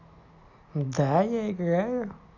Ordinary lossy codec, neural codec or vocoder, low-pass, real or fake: none; none; 7.2 kHz; real